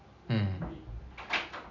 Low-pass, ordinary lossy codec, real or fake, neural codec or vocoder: 7.2 kHz; none; real; none